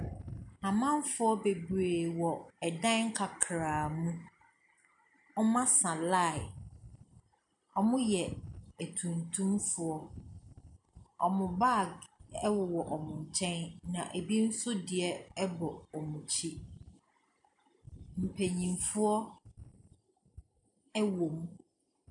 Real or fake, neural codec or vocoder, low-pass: real; none; 10.8 kHz